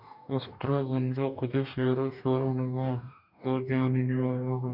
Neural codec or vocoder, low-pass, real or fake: codec, 44.1 kHz, 2.6 kbps, DAC; 5.4 kHz; fake